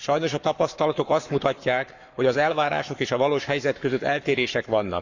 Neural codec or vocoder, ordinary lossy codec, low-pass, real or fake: codec, 44.1 kHz, 7.8 kbps, Pupu-Codec; none; 7.2 kHz; fake